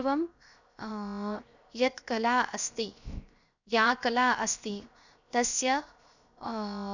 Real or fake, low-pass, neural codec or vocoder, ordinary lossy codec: fake; 7.2 kHz; codec, 16 kHz, 0.7 kbps, FocalCodec; none